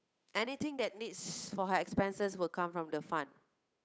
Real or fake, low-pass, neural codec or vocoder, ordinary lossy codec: fake; none; codec, 16 kHz, 8 kbps, FunCodec, trained on Chinese and English, 25 frames a second; none